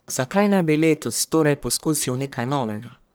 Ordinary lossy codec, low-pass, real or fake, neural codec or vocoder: none; none; fake; codec, 44.1 kHz, 1.7 kbps, Pupu-Codec